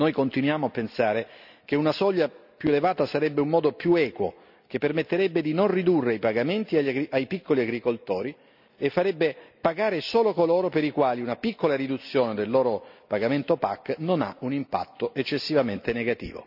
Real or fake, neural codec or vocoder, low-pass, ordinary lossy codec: real; none; 5.4 kHz; none